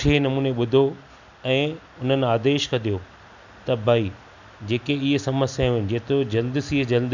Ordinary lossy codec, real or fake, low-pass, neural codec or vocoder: none; real; 7.2 kHz; none